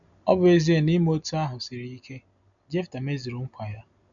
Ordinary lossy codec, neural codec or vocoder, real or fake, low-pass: none; none; real; 7.2 kHz